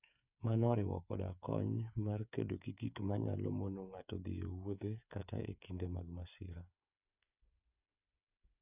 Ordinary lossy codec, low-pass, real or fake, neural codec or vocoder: none; 3.6 kHz; fake; codec, 16 kHz, 8 kbps, FreqCodec, smaller model